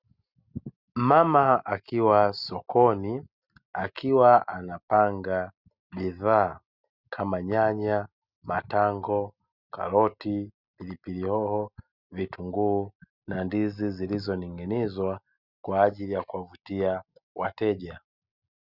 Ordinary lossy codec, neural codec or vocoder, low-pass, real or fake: Opus, 64 kbps; none; 5.4 kHz; real